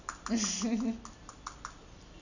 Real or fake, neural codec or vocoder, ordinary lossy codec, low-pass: real; none; none; 7.2 kHz